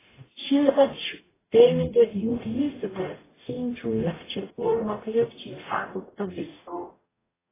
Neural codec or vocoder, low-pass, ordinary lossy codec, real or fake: codec, 44.1 kHz, 0.9 kbps, DAC; 3.6 kHz; AAC, 16 kbps; fake